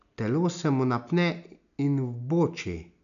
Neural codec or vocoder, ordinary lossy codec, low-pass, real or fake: none; none; 7.2 kHz; real